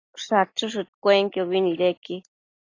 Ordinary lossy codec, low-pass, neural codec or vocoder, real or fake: AAC, 32 kbps; 7.2 kHz; none; real